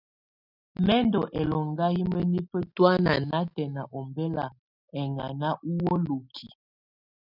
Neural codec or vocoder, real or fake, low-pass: none; real; 5.4 kHz